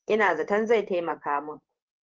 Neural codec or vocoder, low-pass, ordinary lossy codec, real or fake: codec, 16 kHz in and 24 kHz out, 1 kbps, XY-Tokenizer; 7.2 kHz; Opus, 16 kbps; fake